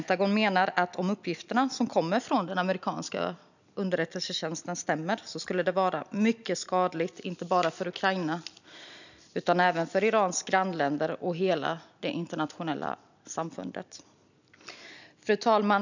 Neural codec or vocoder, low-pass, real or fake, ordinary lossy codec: none; 7.2 kHz; real; none